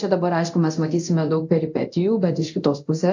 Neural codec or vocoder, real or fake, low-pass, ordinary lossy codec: codec, 24 kHz, 0.9 kbps, DualCodec; fake; 7.2 kHz; AAC, 48 kbps